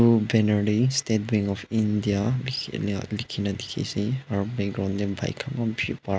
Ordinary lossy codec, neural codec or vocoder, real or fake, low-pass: none; none; real; none